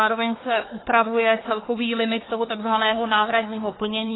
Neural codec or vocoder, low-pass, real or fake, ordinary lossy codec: codec, 24 kHz, 1 kbps, SNAC; 7.2 kHz; fake; AAC, 16 kbps